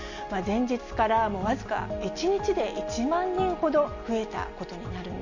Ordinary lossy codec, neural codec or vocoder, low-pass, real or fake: none; none; 7.2 kHz; real